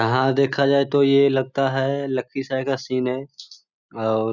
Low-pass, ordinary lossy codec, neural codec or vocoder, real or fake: 7.2 kHz; none; none; real